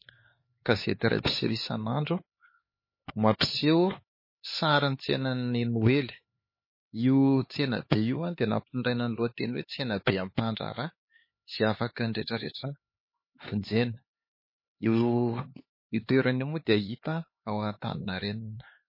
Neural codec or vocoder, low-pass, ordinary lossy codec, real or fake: codec, 16 kHz, 4 kbps, X-Codec, HuBERT features, trained on LibriSpeech; 5.4 kHz; MP3, 24 kbps; fake